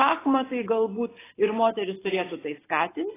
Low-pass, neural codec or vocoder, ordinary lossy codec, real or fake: 3.6 kHz; none; AAC, 16 kbps; real